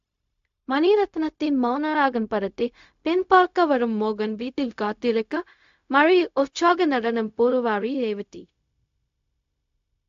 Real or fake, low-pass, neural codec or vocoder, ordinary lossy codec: fake; 7.2 kHz; codec, 16 kHz, 0.4 kbps, LongCat-Audio-Codec; AAC, 64 kbps